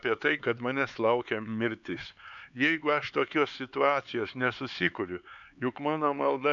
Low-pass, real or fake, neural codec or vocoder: 7.2 kHz; fake; codec, 16 kHz, 4 kbps, X-Codec, HuBERT features, trained on LibriSpeech